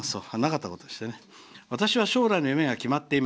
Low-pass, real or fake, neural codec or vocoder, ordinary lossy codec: none; real; none; none